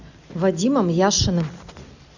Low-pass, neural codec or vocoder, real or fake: 7.2 kHz; none; real